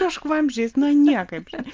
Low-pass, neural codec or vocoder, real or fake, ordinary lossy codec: 7.2 kHz; none; real; Opus, 24 kbps